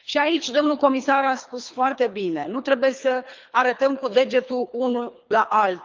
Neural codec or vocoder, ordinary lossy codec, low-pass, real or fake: codec, 24 kHz, 3 kbps, HILCodec; Opus, 24 kbps; 7.2 kHz; fake